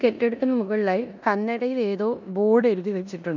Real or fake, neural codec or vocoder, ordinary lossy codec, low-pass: fake; codec, 16 kHz in and 24 kHz out, 0.9 kbps, LongCat-Audio-Codec, four codebook decoder; none; 7.2 kHz